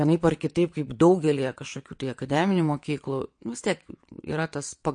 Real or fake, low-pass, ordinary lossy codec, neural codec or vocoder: fake; 9.9 kHz; MP3, 48 kbps; vocoder, 22.05 kHz, 80 mel bands, WaveNeXt